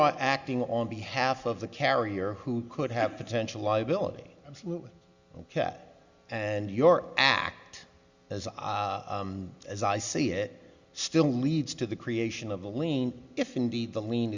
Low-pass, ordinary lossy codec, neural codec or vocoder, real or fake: 7.2 kHz; Opus, 64 kbps; none; real